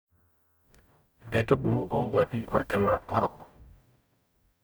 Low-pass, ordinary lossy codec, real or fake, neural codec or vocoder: none; none; fake; codec, 44.1 kHz, 0.9 kbps, DAC